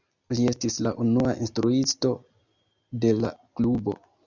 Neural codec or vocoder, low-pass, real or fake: none; 7.2 kHz; real